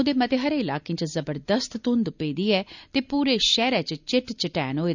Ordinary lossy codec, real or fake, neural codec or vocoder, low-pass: none; real; none; 7.2 kHz